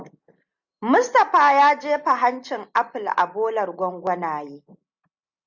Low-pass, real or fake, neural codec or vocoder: 7.2 kHz; real; none